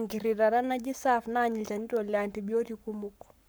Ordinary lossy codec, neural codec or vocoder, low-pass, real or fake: none; codec, 44.1 kHz, 7.8 kbps, Pupu-Codec; none; fake